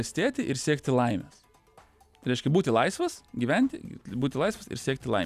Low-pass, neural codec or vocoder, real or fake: 14.4 kHz; none; real